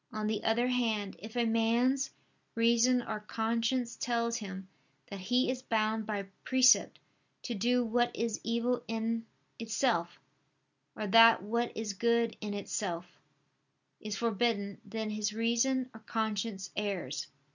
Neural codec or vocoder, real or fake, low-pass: none; real; 7.2 kHz